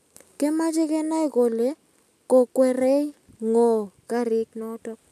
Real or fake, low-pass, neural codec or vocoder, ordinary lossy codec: real; 14.4 kHz; none; none